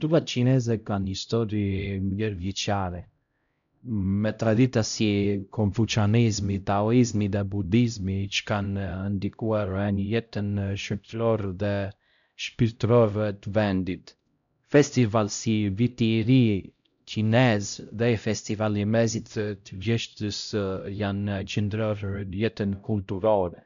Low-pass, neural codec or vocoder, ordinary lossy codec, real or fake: 7.2 kHz; codec, 16 kHz, 0.5 kbps, X-Codec, HuBERT features, trained on LibriSpeech; none; fake